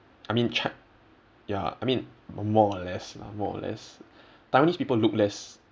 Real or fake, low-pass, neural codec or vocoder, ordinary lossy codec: real; none; none; none